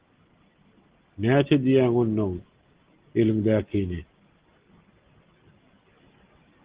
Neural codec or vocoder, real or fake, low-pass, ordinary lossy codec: none; real; 3.6 kHz; Opus, 16 kbps